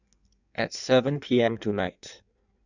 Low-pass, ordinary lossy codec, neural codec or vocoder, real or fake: 7.2 kHz; none; codec, 16 kHz in and 24 kHz out, 1.1 kbps, FireRedTTS-2 codec; fake